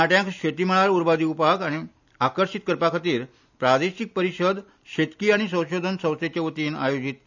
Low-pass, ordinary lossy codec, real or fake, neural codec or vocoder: 7.2 kHz; none; real; none